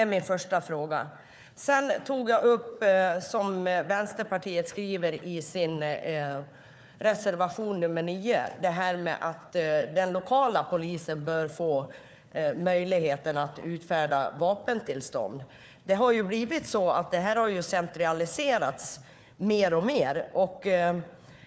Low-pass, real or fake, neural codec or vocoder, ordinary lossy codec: none; fake; codec, 16 kHz, 4 kbps, FunCodec, trained on Chinese and English, 50 frames a second; none